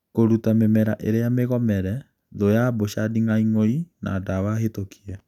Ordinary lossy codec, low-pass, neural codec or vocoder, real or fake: none; 19.8 kHz; none; real